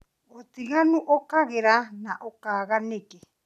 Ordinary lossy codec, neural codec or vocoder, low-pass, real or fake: none; none; 14.4 kHz; real